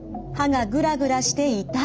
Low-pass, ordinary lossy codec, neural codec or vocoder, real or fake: none; none; none; real